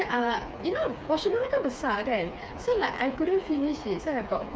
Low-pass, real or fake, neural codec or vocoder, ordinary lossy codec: none; fake; codec, 16 kHz, 4 kbps, FreqCodec, smaller model; none